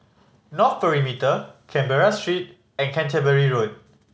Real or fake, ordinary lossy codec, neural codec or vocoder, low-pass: real; none; none; none